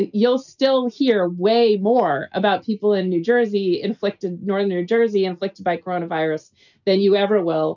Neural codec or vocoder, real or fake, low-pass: none; real; 7.2 kHz